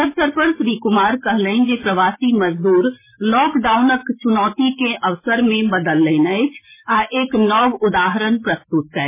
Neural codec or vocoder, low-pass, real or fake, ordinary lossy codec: none; 3.6 kHz; real; MP3, 16 kbps